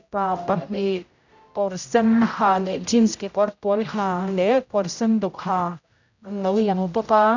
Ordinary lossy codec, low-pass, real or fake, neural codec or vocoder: none; 7.2 kHz; fake; codec, 16 kHz, 0.5 kbps, X-Codec, HuBERT features, trained on general audio